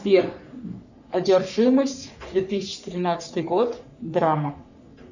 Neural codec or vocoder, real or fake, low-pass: codec, 44.1 kHz, 3.4 kbps, Pupu-Codec; fake; 7.2 kHz